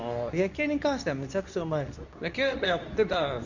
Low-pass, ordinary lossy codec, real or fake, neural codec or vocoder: 7.2 kHz; none; fake; codec, 24 kHz, 0.9 kbps, WavTokenizer, medium speech release version 2